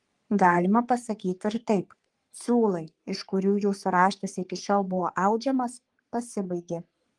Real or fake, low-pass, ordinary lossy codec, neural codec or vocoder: fake; 10.8 kHz; Opus, 24 kbps; codec, 44.1 kHz, 3.4 kbps, Pupu-Codec